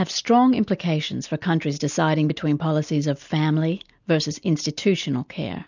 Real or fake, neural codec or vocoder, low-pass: real; none; 7.2 kHz